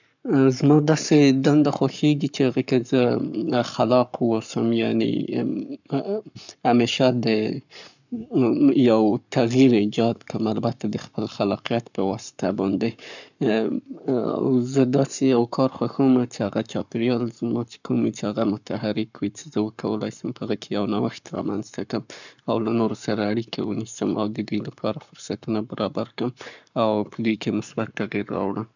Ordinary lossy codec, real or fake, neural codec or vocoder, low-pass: none; fake; codec, 44.1 kHz, 7.8 kbps, Pupu-Codec; 7.2 kHz